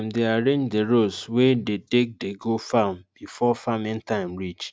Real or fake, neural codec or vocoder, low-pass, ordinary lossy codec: real; none; none; none